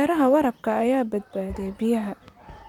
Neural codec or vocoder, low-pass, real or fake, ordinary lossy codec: vocoder, 44.1 kHz, 128 mel bands, Pupu-Vocoder; 19.8 kHz; fake; none